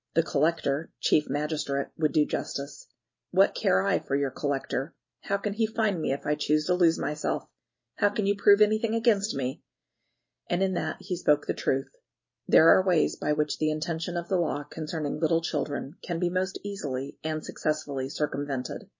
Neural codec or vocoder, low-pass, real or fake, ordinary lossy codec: none; 7.2 kHz; real; MP3, 32 kbps